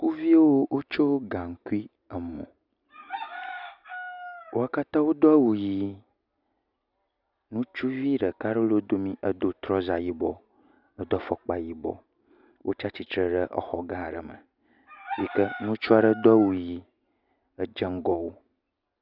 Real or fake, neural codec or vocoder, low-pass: real; none; 5.4 kHz